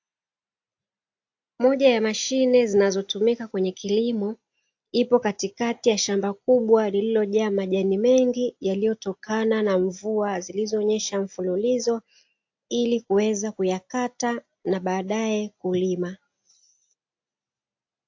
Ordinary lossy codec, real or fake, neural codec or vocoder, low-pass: AAC, 48 kbps; real; none; 7.2 kHz